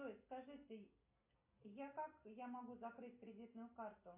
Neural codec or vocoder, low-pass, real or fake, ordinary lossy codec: none; 3.6 kHz; real; MP3, 24 kbps